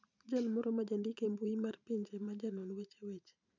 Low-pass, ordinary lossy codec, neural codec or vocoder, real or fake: 7.2 kHz; none; vocoder, 44.1 kHz, 128 mel bands every 512 samples, BigVGAN v2; fake